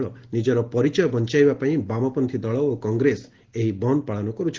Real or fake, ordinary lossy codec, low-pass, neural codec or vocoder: real; Opus, 16 kbps; 7.2 kHz; none